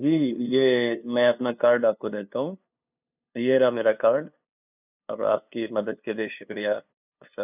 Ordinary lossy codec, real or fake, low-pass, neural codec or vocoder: none; fake; 3.6 kHz; codec, 16 kHz, 2 kbps, FunCodec, trained on LibriTTS, 25 frames a second